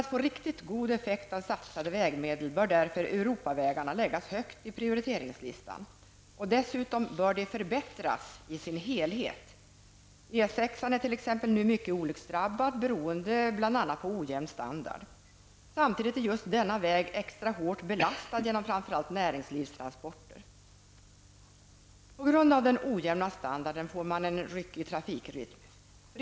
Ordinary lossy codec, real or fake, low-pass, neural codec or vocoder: none; real; none; none